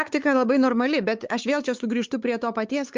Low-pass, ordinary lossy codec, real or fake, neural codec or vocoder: 7.2 kHz; Opus, 24 kbps; fake; codec, 16 kHz, 4 kbps, X-Codec, WavLM features, trained on Multilingual LibriSpeech